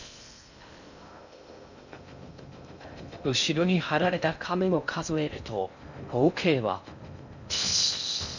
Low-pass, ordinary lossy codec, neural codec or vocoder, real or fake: 7.2 kHz; none; codec, 16 kHz in and 24 kHz out, 0.6 kbps, FocalCodec, streaming, 2048 codes; fake